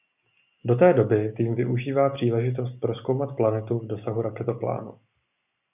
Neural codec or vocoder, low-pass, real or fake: none; 3.6 kHz; real